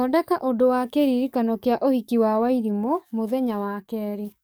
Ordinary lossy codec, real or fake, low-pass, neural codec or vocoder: none; fake; none; codec, 44.1 kHz, 7.8 kbps, DAC